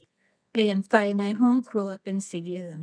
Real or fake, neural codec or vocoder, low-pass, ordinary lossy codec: fake; codec, 24 kHz, 0.9 kbps, WavTokenizer, medium music audio release; 9.9 kHz; none